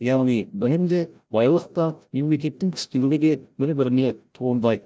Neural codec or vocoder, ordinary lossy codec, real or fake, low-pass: codec, 16 kHz, 0.5 kbps, FreqCodec, larger model; none; fake; none